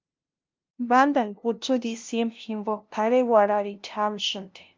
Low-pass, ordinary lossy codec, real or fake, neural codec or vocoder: 7.2 kHz; Opus, 24 kbps; fake; codec, 16 kHz, 0.5 kbps, FunCodec, trained on LibriTTS, 25 frames a second